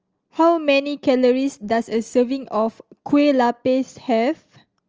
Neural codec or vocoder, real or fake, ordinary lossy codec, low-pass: none; real; Opus, 24 kbps; 7.2 kHz